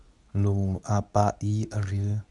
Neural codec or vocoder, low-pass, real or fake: codec, 24 kHz, 0.9 kbps, WavTokenizer, medium speech release version 2; 10.8 kHz; fake